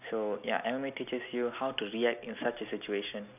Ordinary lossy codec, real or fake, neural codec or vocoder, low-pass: none; real; none; 3.6 kHz